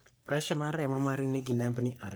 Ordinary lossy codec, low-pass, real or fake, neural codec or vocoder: none; none; fake; codec, 44.1 kHz, 3.4 kbps, Pupu-Codec